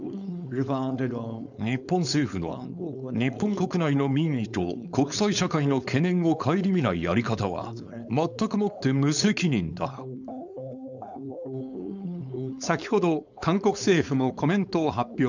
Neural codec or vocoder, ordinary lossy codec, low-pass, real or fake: codec, 16 kHz, 4.8 kbps, FACodec; none; 7.2 kHz; fake